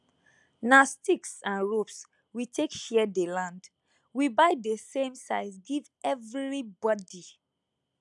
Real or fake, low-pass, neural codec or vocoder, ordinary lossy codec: real; 10.8 kHz; none; none